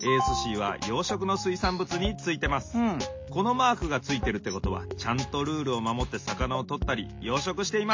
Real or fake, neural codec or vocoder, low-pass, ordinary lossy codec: real; none; 7.2 kHz; MP3, 48 kbps